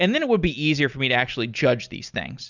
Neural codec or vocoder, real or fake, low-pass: none; real; 7.2 kHz